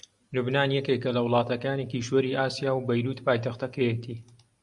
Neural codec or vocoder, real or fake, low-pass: none; real; 10.8 kHz